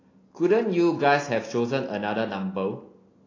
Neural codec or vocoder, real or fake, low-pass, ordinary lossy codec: none; real; 7.2 kHz; AAC, 32 kbps